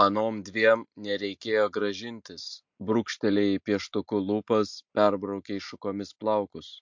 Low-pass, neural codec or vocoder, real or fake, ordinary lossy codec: 7.2 kHz; none; real; MP3, 48 kbps